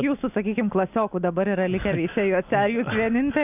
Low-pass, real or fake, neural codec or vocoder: 3.6 kHz; real; none